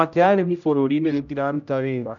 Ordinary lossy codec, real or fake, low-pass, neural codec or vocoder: none; fake; 7.2 kHz; codec, 16 kHz, 0.5 kbps, X-Codec, HuBERT features, trained on general audio